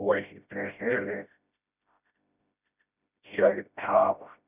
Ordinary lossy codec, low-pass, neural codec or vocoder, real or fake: none; 3.6 kHz; codec, 16 kHz, 0.5 kbps, FreqCodec, smaller model; fake